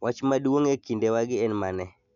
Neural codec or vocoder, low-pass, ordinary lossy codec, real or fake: none; 7.2 kHz; none; real